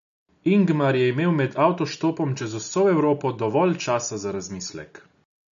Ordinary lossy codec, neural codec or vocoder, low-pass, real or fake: AAC, 96 kbps; none; 7.2 kHz; real